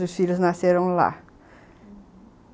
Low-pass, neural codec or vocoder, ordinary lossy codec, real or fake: none; none; none; real